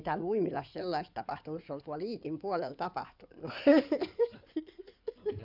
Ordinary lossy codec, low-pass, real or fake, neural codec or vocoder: none; 5.4 kHz; fake; codec, 16 kHz in and 24 kHz out, 2.2 kbps, FireRedTTS-2 codec